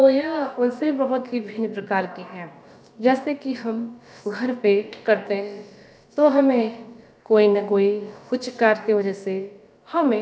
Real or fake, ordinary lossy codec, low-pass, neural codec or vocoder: fake; none; none; codec, 16 kHz, about 1 kbps, DyCAST, with the encoder's durations